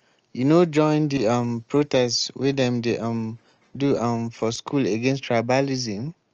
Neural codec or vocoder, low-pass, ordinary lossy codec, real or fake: none; 7.2 kHz; Opus, 24 kbps; real